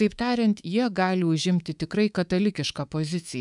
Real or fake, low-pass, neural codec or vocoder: fake; 10.8 kHz; codec, 24 kHz, 3.1 kbps, DualCodec